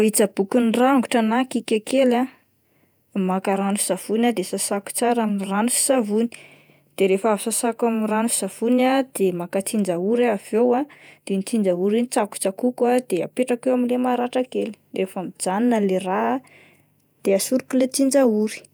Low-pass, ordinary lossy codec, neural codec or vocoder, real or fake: none; none; vocoder, 48 kHz, 128 mel bands, Vocos; fake